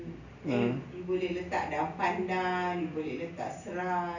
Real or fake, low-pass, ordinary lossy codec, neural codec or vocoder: real; 7.2 kHz; none; none